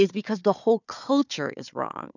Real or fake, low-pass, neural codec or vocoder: fake; 7.2 kHz; codec, 16 kHz, 4 kbps, FunCodec, trained on Chinese and English, 50 frames a second